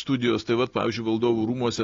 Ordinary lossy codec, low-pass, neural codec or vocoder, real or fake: AAC, 32 kbps; 7.2 kHz; none; real